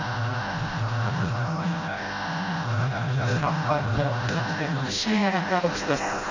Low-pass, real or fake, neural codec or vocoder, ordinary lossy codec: 7.2 kHz; fake; codec, 16 kHz, 0.5 kbps, FreqCodec, smaller model; AAC, 32 kbps